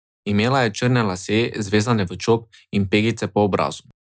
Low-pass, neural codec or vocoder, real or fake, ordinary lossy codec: none; none; real; none